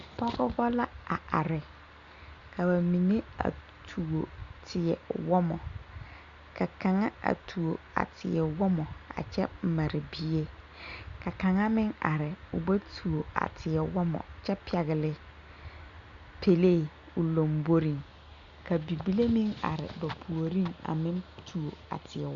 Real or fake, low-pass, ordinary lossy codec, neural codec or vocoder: real; 7.2 kHz; Opus, 64 kbps; none